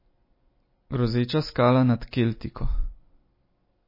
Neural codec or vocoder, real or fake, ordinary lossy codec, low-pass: none; real; MP3, 24 kbps; 5.4 kHz